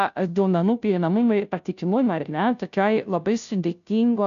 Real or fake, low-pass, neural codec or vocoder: fake; 7.2 kHz; codec, 16 kHz, 0.5 kbps, FunCodec, trained on Chinese and English, 25 frames a second